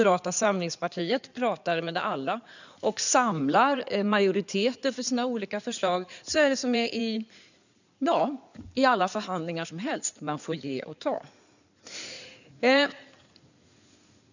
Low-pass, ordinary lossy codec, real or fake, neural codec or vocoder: 7.2 kHz; none; fake; codec, 16 kHz in and 24 kHz out, 2.2 kbps, FireRedTTS-2 codec